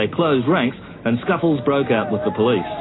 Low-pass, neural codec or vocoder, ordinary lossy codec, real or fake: 7.2 kHz; none; AAC, 16 kbps; real